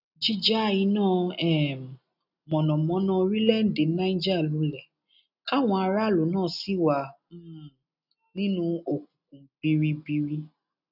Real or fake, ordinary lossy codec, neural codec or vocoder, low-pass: real; none; none; 5.4 kHz